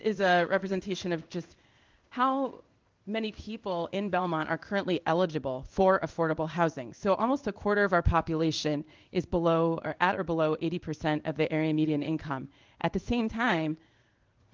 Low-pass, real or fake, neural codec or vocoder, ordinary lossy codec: 7.2 kHz; fake; codec, 16 kHz in and 24 kHz out, 1 kbps, XY-Tokenizer; Opus, 32 kbps